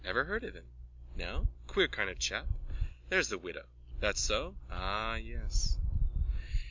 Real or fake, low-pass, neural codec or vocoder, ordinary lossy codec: real; 7.2 kHz; none; MP3, 64 kbps